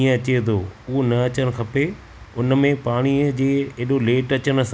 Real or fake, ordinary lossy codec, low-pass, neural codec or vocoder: real; none; none; none